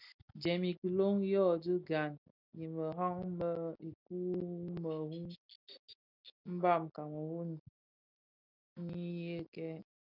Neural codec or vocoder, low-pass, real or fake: none; 5.4 kHz; real